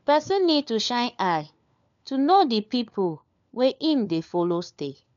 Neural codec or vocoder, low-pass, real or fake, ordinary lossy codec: codec, 16 kHz, 4 kbps, FunCodec, trained on LibriTTS, 50 frames a second; 7.2 kHz; fake; none